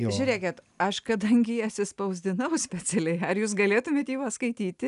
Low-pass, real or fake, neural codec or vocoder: 10.8 kHz; real; none